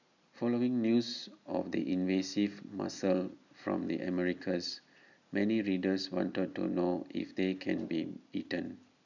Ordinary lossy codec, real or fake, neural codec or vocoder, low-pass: none; real; none; 7.2 kHz